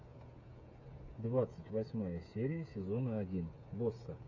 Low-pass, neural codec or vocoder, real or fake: 7.2 kHz; codec, 16 kHz, 8 kbps, FreqCodec, smaller model; fake